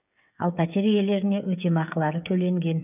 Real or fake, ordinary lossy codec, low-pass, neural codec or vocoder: fake; none; 3.6 kHz; codec, 16 kHz, 8 kbps, FreqCodec, smaller model